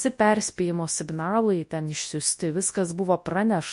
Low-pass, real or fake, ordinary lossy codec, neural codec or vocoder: 10.8 kHz; fake; MP3, 48 kbps; codec, 24 kHz, 0.9 kbps, WavTokenizer, large speech release